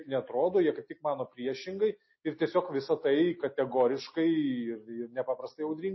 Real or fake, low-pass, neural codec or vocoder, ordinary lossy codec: real; 7.2 kHz; none; MP3, 24 kbps